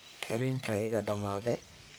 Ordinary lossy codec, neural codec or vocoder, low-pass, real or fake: none; codec, 44.1 kHz, 1.7 kbps, Pupu-Codec; none; fake